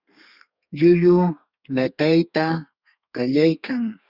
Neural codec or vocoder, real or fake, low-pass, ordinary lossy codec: codec, 32 kHz, 1.9 kbps, SNAC; fake; 5.4 kHz; Opus, 64 kbps